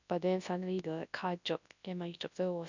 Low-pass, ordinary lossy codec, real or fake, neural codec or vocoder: 7.2 kHz; none; fake; codec, 24 kHz, 0.9 kbps, WavTokenizer, large speech release